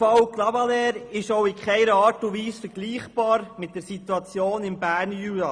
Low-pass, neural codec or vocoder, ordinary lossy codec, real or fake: 9.9 kHz; none; Opus, 64 kbps; real